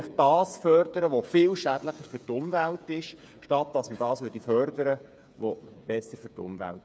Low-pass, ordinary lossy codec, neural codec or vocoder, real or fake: none; none; codec, 16 kHz, 8 kbps, FreqCodec, smaller model; fake